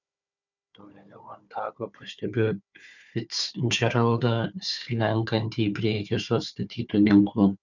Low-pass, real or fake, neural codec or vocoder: 7.2 kHz; fake; codec, 16 kHz, 4 kbps, FunCodec, trained on Chinese and English, 50 frames a second